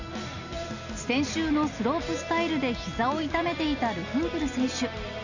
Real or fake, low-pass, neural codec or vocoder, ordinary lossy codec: real; 7.2 kHz; none; none